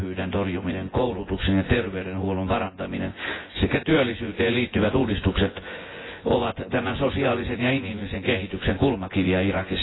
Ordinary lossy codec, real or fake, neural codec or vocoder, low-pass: AAC, 16 kbps; fake; vocoder, 24 kHz, 100 mel bands, Vocos; 7.2 kHz